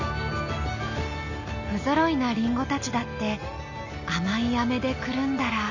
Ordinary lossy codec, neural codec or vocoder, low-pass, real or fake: none; none; 7.2 kHz; real